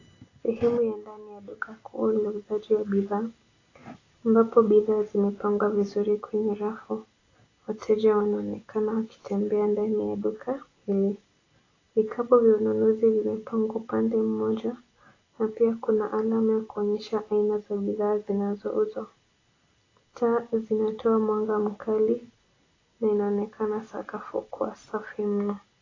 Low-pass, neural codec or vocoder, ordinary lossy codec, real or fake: 7.2 kHz; none; AAC, 32 kbps; real